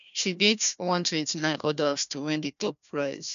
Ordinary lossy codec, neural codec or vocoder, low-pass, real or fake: none; codec, 16 kHz, 1 kbps, FunCodec, trained on Chinese and English, 50 frames a second; 7.2 kHz; fake